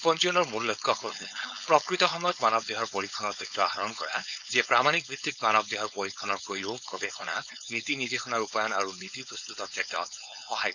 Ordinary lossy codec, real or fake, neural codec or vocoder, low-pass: none; fake; codec, 16 kHz, 4.8 kbps, FACodec; 7.2 kHz